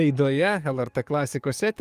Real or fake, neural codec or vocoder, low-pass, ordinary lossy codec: fake; codec, 44.1 kHz, 7.8 kbps, DAC; 14.4 kHz; Opus, 32 kbps